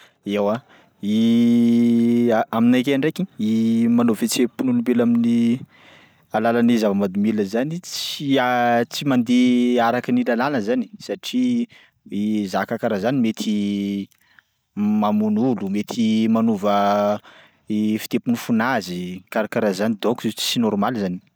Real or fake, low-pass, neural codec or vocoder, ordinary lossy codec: real; none; none; none